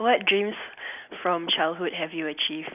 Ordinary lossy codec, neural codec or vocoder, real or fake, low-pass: none; none; real; 3.6 kHz